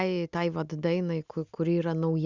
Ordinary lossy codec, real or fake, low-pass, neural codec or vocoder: Opus, 64 kbps; real; 7.2 kHz; none